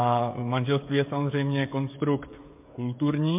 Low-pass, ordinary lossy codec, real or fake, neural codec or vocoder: 3.6 kHz; MP3, 32 kbps; fake; codec, 16 kHz, 16 kbps, FreqCodec, smaller model